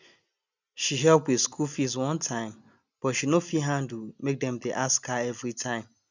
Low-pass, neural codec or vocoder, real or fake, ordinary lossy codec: 7.2 kHz; none; real; none